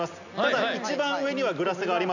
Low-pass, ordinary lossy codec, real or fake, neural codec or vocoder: 7.2 kHz; none; real; none